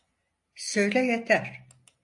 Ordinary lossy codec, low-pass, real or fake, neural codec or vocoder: AAC, 64 kbps; 10.8 kHz; real; none